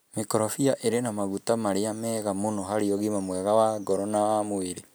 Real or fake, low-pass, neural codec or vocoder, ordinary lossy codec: real; none; none; none